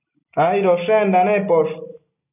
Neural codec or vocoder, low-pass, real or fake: none; 3.6 kHz; real